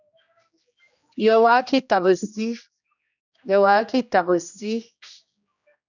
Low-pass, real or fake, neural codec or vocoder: 7.2 kHz; fake; codec, 16 kHz, 1 kbps, X-Codec, HuBERT features, trained on general audio